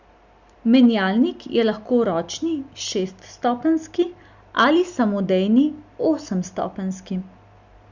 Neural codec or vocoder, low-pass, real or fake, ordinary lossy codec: none; 7.2 kHz; real; Opus, 64 kbps